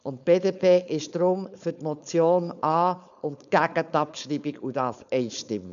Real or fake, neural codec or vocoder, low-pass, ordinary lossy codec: fake; codec, 16 kHz, 4.8 kbps, FACodec; 7.2 kHz; AAC, 96 kbps